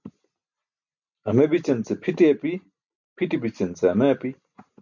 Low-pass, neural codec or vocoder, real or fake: 7.2 kHz; none; real